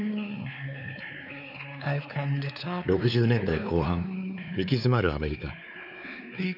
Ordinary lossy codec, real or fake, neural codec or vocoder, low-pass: none; fake; codec, 16 kHz, 4 kbps, X-Codec, WavLM features, trained on Multilingual LibriSpeech; 5.4 kHz